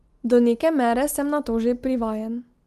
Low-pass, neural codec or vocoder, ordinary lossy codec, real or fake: 14.4 kHz; none; Opus, 24 kbps; real